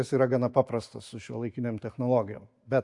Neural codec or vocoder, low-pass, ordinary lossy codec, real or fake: none; 10.8 kHz; AAC, 64 kbps; real